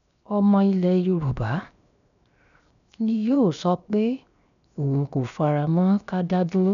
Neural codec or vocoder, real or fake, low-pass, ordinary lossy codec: codec, 16 kHz, 0.7 kbps, FocalCodec; fake; 7.2 kHz; none